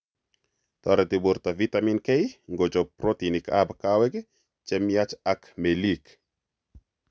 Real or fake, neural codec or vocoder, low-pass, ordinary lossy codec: real; none; none; none